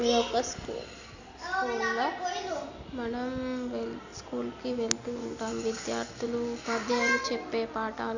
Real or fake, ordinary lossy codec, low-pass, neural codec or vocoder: real; Opus, 64 kbps; 7.2 kHz; none